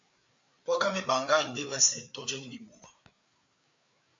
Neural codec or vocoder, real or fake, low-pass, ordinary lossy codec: codec, 16 kHz, 4 kbps, FreqCodec, larger model; fake; 7.2 kHz; MP3, 48 kbps